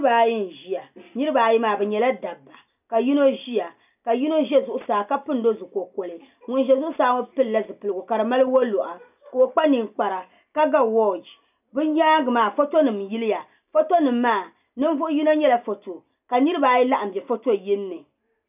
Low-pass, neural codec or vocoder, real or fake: 3.6 kHz; none; real